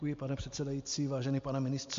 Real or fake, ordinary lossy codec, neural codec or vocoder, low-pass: real; MP3, 48 kbps; none; 7.2 kHz